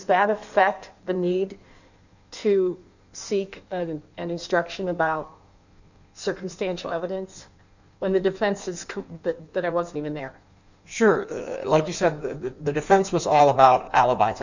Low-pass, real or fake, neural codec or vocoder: 7.2 kHz; fake; codec, 16 kHz in and 24 kHz out, 1.1 kbps, FireRedTTS-2 codec